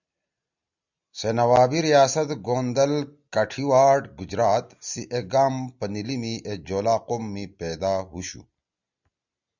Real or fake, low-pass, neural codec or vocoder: real; 7.2 kHz; none